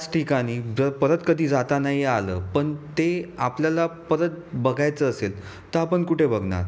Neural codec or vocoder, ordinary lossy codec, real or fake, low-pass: none; none; real; none